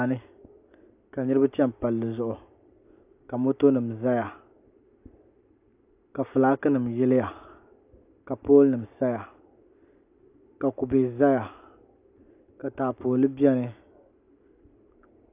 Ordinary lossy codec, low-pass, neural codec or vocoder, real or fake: AAC, 32 kbps; 3.6 kHz; none; real